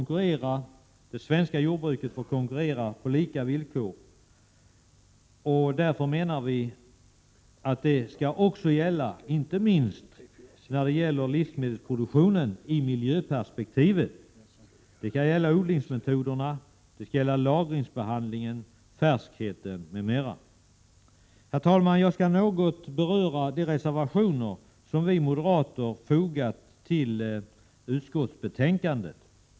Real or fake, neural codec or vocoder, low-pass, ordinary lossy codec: real; none; none; none